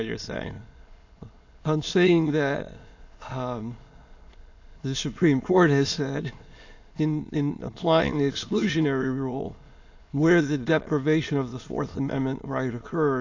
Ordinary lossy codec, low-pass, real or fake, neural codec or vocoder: AAC, 48 kbps; 7.2 kHz; fake; autoencoder, 22.05 kHz, a latent of 192 numbers a frame, VITS, trained on many speakers